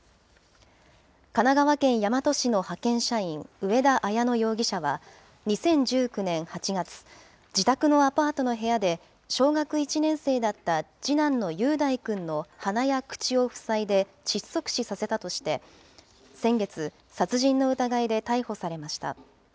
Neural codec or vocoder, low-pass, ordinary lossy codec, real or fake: none; none; none; real